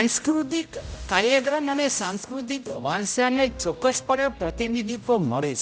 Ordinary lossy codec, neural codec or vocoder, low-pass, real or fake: none; codec, 16 kHz, 0.5 kbps, X-Codec, HuBERT features, trained on general audio; none; fake